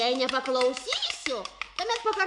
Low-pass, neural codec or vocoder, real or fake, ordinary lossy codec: 10.8 kHz; autoencoder, 48 kHz, 128 numbers a frame, DAC-VAE, trained on Japanese speech; fake; MP3, 64 kbps